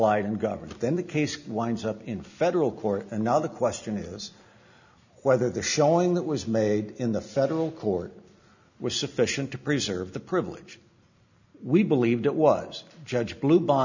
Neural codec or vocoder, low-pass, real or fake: none; 7.2 kHz; real